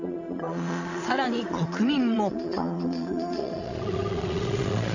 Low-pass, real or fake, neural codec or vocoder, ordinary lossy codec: 7.2 kHz; fake; vocoder, 22.05 kHz, 80 mel bands, Vocos; none